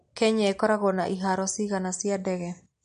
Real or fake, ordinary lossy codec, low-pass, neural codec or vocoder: real; MP3, 48 kbps; 9.9 kHz; none